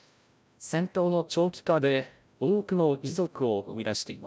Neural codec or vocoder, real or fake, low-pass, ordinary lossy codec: codec, 16 kHz, 0.5 kbps, FreqCodec, larger model; fake; none; none